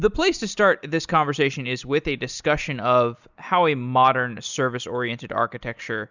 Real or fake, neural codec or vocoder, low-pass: real; none; 7.2 kHz